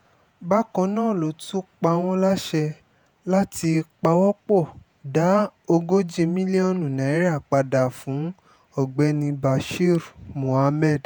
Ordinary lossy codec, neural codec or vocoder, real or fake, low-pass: none; vocoder, 48 kHz, 128 mel bands, Vocos; fake; none